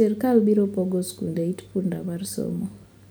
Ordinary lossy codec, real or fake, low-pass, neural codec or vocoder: none; real; none; none